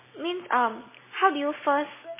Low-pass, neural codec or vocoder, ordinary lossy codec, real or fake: 3.6 kHz; none; MP3, 16 kbps; real